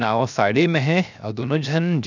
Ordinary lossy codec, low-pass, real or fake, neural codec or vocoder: none; 7.2 kHz; fake; codec, 16 kHz, 0.7 kbps, FocalCodec